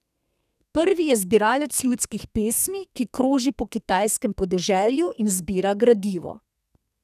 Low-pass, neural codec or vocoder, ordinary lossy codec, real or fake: 14.4 kHz; codec, 32 kHz, 1.9 kbps, SNAC; none; fake